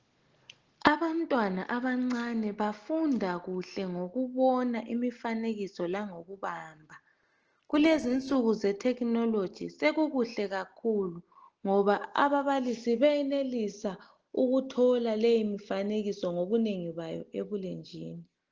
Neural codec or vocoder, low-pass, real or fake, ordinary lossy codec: none; 7.2 kHz; real; Opus, 16 kbps